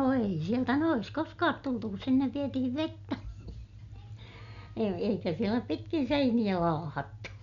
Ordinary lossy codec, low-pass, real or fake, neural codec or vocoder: none; 7.2 kHz; real; none